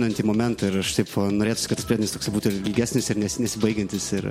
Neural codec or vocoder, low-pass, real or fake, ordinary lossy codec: none; 19.8 kHz; real; MP3, 64 kbps